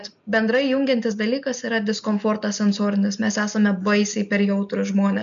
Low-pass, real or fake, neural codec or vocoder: 7.2 kHz; real; none